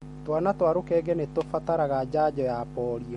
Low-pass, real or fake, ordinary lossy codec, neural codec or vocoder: 19.8 kHz; real; MP3, 48 kbps; none